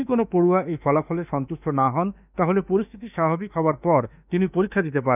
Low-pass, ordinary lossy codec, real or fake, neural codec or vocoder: 3.6 kHz; none; fake; autoencoder, 48 kHz, 32 numbers a frame, DAC-VAE, trained on Japanese speech